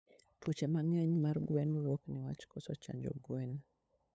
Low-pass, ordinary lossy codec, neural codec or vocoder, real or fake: none; none; codec, 16 kHz, 8 kbps, FunCodec, trained on LibriTTS, 25 frames a second; fake